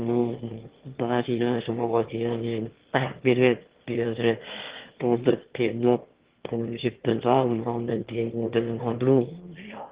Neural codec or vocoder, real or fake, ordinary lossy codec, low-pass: autoencoder, 22.05 kHz, a latent of 192 numbers a frame, VITS, trained on one speaker; fake; Opus, 16 kbps; 3.6 kHz